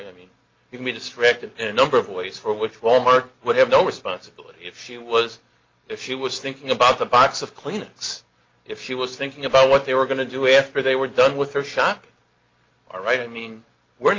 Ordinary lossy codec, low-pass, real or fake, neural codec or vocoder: Opus, 24 kbps; 7.2 kHz; real; none